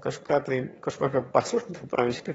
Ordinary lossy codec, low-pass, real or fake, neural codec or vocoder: AAC, 24 kbps; 9.9 kHz; fake; autoencoder, 22.05 kHz, a latent of 192 numbers a frame, VITS, trained on one speaker